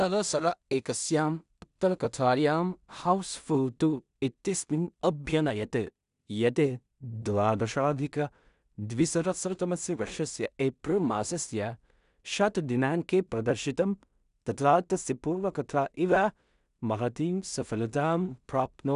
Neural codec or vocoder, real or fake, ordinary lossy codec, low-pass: codec, 16 kHz in and 24 kHz out, 0.4 kbps, LongCat-Audio-Codec, two codebook decoder; fake; none; 10.8 kHz